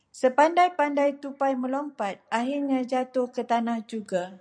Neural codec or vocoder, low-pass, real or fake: vocoder, 44.1 kHz, 128 mel bands every 256 samples, BigVGAN v2; 9.9 kHz; fake